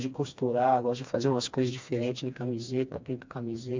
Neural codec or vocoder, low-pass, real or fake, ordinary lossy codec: codec, 16 kHz, 2 kbps, FreqCodec, smaller model; 7.2 kHz; fake; MP3, 64 kbps